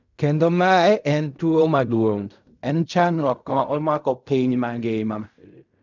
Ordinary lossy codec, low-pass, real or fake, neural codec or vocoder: none; 7.2 kHz; fake; codec, 16 kHz in and 24 kHz out, 0.4 kbps, LongCat-Audio-Codec, fine tuned four codebook decoder